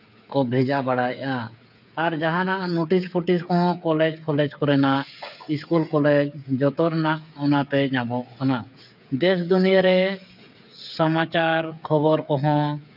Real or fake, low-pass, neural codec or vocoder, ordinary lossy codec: fake; 5.4 kHz; codec, 16 kHz, 8 kbps, FreqCodec, smaller model; none